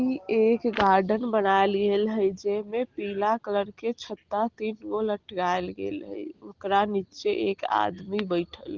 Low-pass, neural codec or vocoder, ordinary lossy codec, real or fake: 7.2 kHz; none; Opus, 16 kbps; real